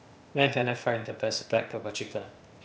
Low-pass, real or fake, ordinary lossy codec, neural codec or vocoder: none; fake; none; codec, 16 kHz, 0.8 kbps, ZipCodec